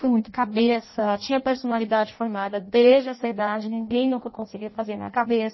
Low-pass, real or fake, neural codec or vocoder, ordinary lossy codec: 7.2 kHz; fake; codec, 16 kHz in and 24 kHz out, 0.6 kbps, FireRedTTS-2 codec; MP3, 24 kbps